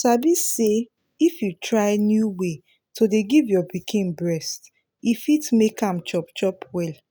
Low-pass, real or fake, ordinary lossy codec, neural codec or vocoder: none; real; none; none